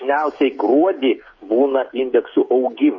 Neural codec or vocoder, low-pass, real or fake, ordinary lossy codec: codec, 16 kHz, 16 kbps, FreqCodec, smaller model; 7.2 kHz; fake; MP3, 32 kbps